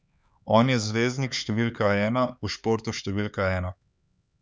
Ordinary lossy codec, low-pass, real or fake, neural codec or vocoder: none; none; fake; codec, 16 kHz, 4 kbps, X-Codec, HuBERT features, trained on LibriSpeech